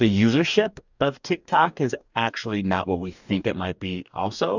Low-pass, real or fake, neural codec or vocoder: 7.2 kHz; fake; codec, 44.1 kHz, 2.6 kbps, DAC